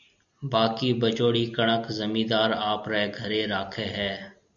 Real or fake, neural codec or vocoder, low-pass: real; none; 7.2 kHz